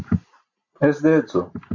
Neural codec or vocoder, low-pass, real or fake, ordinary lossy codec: none; 7.2 kHz; real; AAC, 48 kbps